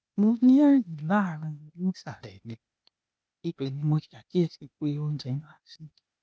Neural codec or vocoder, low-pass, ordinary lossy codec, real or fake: codec, 16 kHz, 0.8 kbps, ZipCodec; none; none; fake